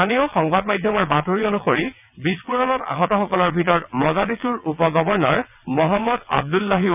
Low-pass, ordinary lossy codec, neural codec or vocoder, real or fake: 3.6 kHz; none; vocoder, 22.05 kHz, 80 mel bands, WaveNeXt; fake